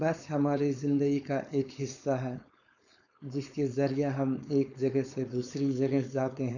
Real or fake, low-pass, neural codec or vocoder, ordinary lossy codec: fake; 7.2 kHz; codec, 16 kHz, 4.8 kbps, FACodec; none